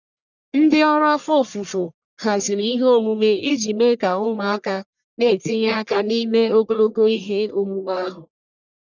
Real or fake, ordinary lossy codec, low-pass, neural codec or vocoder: fake; none; 7.2 kHz; codec, 44.1 kHz, 1.7 kbps, Pupu-Codec